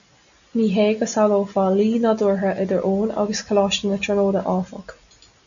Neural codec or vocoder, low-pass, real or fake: none; 7.2 kHz; real